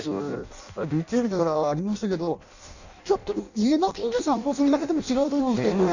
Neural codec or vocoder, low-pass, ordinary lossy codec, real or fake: codec, 16 kHz in and 24 kHz out, 0.6 kbps, FireRedTTS-2 codec; 7.2 kHz; none; fake